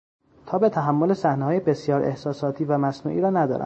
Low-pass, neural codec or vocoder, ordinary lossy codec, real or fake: 9.9 kHz; none; MP3, 32 kbps; real